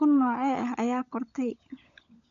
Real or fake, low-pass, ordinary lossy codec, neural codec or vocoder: fake; 7.2 kHz; none; codec, 16 kHz, 16 kbps, FunCodec, trained on LibriTTS, 50 frames a second